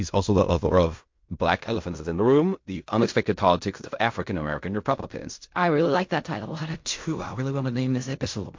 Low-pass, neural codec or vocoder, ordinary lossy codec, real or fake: 7.2 kHz; codec, 16 kHz in and 24 kHz out, 0.4 kbps, LongCat-Audio-Codec, fine tuned four codebook decoder; MP3, 48 kbps; fake